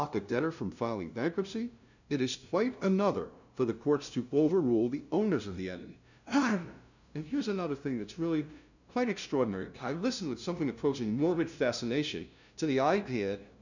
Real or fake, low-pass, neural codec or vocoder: fake; 7.2 kHz; codec, 16 kHz, 0.5 kbps, FunCodec, trained on LibriTTS, 25 frames a second